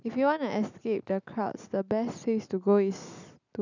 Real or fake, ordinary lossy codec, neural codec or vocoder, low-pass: real; none; none; 7.2 kHz